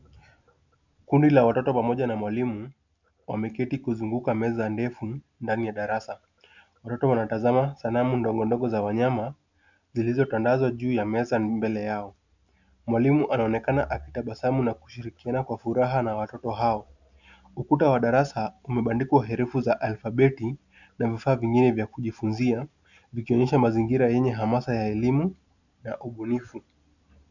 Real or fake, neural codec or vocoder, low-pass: real; none; 7.2 kHz